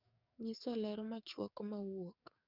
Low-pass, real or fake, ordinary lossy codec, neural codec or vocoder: 5.4 kHz; fake; none; codec, 44.1 kHz, 7.8 kbps, DAC